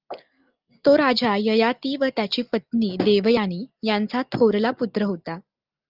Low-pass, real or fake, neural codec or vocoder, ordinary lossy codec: 5.4 kHz; real; none; Opus, 24 kbps